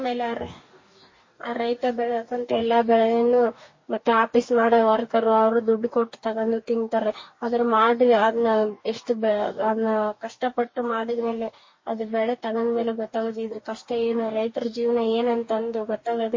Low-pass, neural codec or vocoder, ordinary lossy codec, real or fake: 7.2 kHz; codec, 44.1 kHz, 2.6 kbps, DAC; MP3, 32 kbps; fake